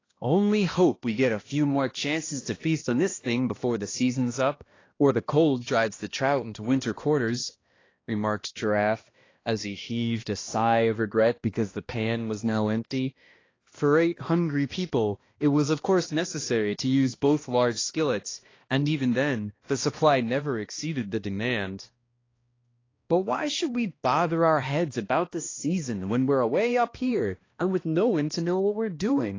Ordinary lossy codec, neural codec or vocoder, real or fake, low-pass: AAC, 32 kbps; codec, 16 kHz, 1 kbps, X-Codec, HuBERT features, trained on balanced general audio; fake; 7.2 kHz